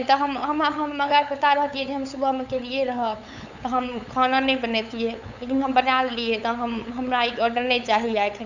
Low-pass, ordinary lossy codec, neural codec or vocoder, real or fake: 7.2 kHz; none; codec, 16 kHz, 8 kbps, FunCodec, trained on LibriTTS, 25 frames a second; fake